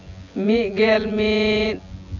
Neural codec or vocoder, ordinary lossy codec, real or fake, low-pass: vocoder, 24 kHz, 100 mel bands, Vocos; none; fake; 7.2 kHz